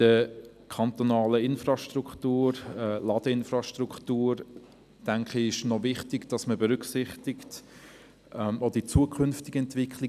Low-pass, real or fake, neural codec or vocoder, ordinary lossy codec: 14.4 kHz; real; none; none